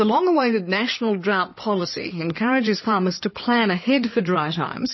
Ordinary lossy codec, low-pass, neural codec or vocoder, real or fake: MP3, 24 kbps; 7.2 kHz; codec, 16 kHz in and 24 kHz out, 2.2 kbps, FireRedTTS-2 codec; fake